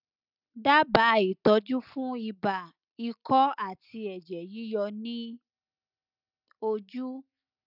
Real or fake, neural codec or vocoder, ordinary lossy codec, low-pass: real; none; none; 5.4 kHz